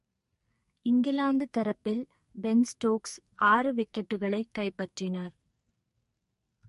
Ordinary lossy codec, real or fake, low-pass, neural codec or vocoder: MP3, 48 kbps; fake; 14.4 kHz; codec, 44.1 kHz, 2.6 kbps, SNAC